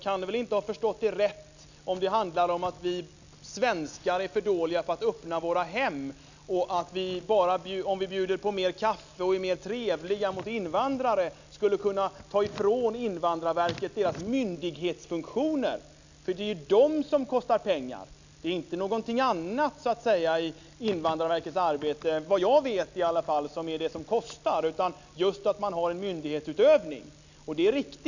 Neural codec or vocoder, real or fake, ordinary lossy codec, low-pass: none; real; none; 7.2 kHz